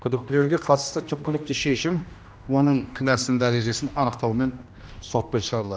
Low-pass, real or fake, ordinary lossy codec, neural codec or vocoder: none; fake; none; codec, 16 kHz, 1 kbps, X-Codec, HuBERT features, trained on general audio